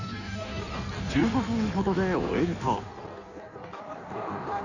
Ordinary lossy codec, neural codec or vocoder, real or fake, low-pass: none; codec, 16 kHz in and 24 kHz out, 1.1 kbps, FireRedTTS-2 codec; fake; 7.2 kHz